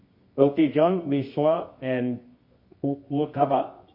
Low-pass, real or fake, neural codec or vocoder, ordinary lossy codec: 5.4 kHz; fake; codec, 24 kHz, 0.9 kbps, WavTokenizer, medium music audio release; MP3, 32 kbps